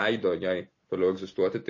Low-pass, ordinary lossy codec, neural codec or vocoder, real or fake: 7.2 kHz; MP3, 32 kbps; none; real